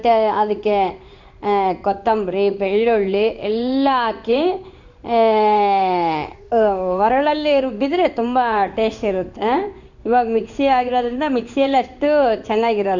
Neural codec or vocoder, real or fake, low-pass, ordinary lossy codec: codec, 16 kHz in and 24 kHz out, 1 kbps, XY-Tokenizer; fake; 7.2 kHz; none